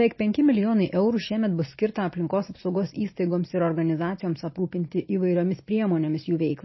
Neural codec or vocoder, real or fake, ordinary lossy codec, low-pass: none; real; MP3, 24 kbps; 7.2 kHz